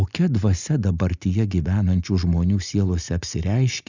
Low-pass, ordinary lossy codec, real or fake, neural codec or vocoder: 7.2 kHz; Opus, 64 kbps; real; none